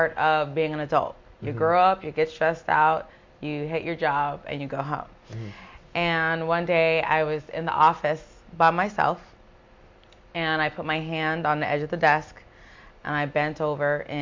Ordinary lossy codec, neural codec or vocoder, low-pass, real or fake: MP3, 48 kbps; none; 7.2 kHz; real